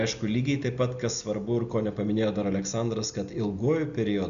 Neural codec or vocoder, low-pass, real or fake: none; 7.2 kHz; real